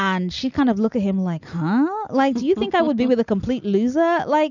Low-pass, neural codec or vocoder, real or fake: 7.2 kHz; none; real